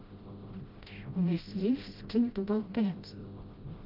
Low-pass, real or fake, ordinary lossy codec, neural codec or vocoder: 5.4 kHz; fake; Opus, 32 kbps; codec, 16 kHz, 0.5 kbps, FreqCodec, smaller model